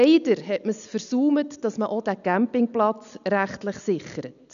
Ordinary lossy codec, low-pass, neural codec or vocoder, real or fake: none; 7.2 kHz; none; real